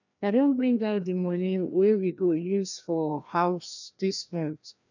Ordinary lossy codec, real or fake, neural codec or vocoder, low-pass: none; fake; codec, 16 kHz, 1 kbps, FreqCodec, larger model; 7.2 kHz